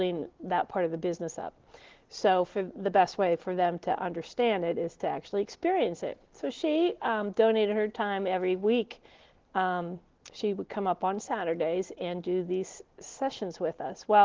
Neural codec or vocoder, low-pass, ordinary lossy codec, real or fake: none; 7.2 kHz; Opus, 16 kbps; real